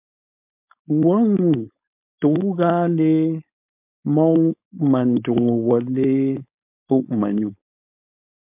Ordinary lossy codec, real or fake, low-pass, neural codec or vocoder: MP3, 32 kbps; fake; 3.6 kHz; codec, 16 kHz, 4.8 kbps, FACodec